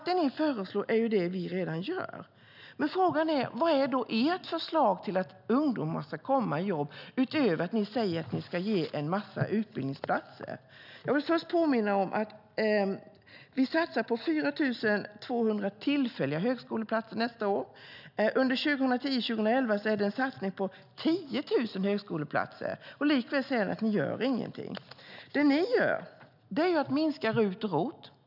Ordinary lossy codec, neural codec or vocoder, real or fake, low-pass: none; none; real; 5.4 kHz